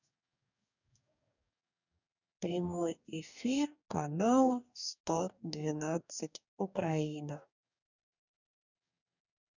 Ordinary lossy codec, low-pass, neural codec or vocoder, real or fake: none; 7.2 kHz; codec, 44.1 kHz, 2.6 kbps, DAC; fake